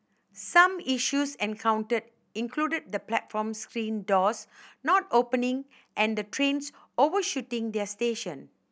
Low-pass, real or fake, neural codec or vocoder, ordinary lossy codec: none; real; none; none